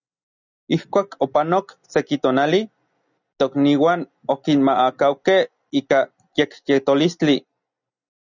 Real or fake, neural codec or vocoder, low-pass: real; none; 7.2 kHz